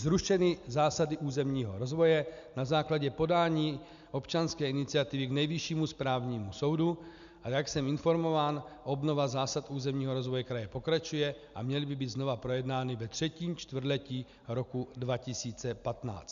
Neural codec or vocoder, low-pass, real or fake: none; 7.2 kHz; real